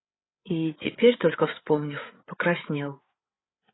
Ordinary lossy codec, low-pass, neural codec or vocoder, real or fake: AAC, 16 kbps; 7.2 kHz; codec, 16 kHz, 8 kbps, FreqCodec, larger model; fake